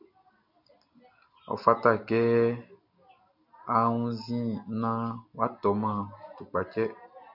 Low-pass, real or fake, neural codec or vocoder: 5.4 kHz; real; none